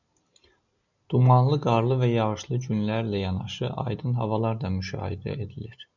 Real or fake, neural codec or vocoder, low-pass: real; none; 7.2 kHz